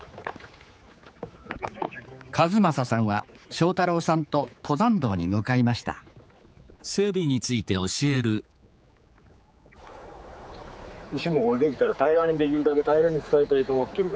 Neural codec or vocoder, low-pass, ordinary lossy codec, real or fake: codec, 16 kHz, 4 kbps, X-Codec, HuBERT features, trained on general audio; none; none; fake